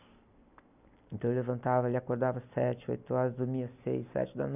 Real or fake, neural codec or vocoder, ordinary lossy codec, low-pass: real; none; none; 3.6 kHz